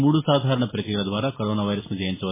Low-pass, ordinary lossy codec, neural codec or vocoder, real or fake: 3.6 kHz; MP3, 16 kbps; none; real